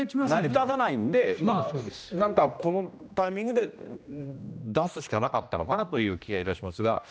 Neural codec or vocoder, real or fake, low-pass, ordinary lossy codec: codec, 16 kHz, 1 kbps, X-Codec, HuBERT features, trained on general audio; fake; none; none